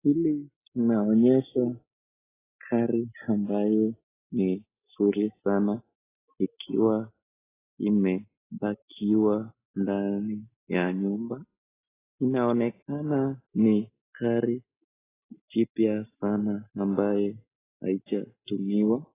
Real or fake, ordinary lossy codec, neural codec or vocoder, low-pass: real; AAC, 16 kbps; none; 3.6 kHz